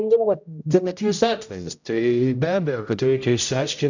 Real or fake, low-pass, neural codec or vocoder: fake; 7.2 kHz; codec, 16 kHz, 0.5 kbps, X-Codec, HuBERT features, trained on general audio